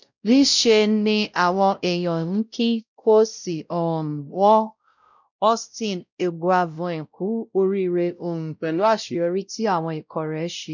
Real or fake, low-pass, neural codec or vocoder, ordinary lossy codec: fake; 7.2 kHz; codec, 16 kHz, 0.5 kbps, X-Codec, WavLM features, trained on Multilingual LibriSpeech; none